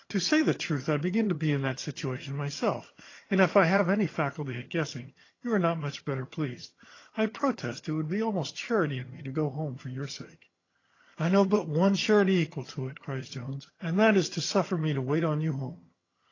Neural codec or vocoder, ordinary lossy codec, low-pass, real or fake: vocoder, 22.05 kHz, 80 mel bands, HiFi-GAN; AAC, 32 kbps; 7.2 kHz; fake